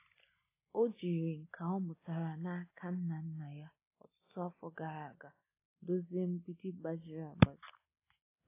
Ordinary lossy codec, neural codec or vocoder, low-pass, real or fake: AAC, 24 kbps; none; 3.6 kHz; real